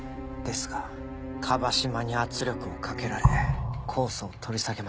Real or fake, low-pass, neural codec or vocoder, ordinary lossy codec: real; none; none; none